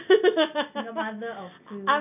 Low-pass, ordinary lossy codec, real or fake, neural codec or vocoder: 3.6 kHz; none; real; none